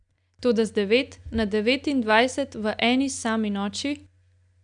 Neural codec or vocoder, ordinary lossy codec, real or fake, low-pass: none; none; real; 9.9 kHz